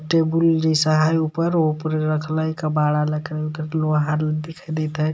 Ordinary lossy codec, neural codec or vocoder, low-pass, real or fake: none; none; none; real